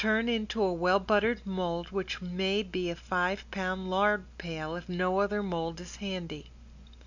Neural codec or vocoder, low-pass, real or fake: none; 7.2 kHz; real